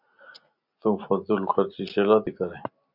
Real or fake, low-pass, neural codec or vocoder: real; 5.4 kHz; none